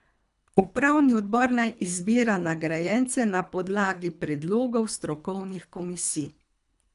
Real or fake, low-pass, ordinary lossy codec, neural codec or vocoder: fake; 10.8 kHz; none; codec, 24 kHz, 3 kbps, HILCodec